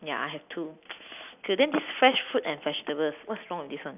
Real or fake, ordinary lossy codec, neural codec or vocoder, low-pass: real; none; none; 3.6 kHz